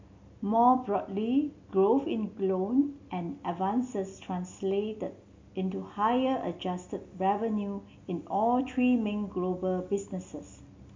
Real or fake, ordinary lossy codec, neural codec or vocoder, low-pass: real; MP3, 48 kbps; none; 7.2 kHz